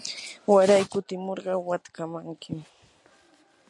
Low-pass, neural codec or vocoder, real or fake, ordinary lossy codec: 10.8 kHz; none; real; MP3, 64 kbps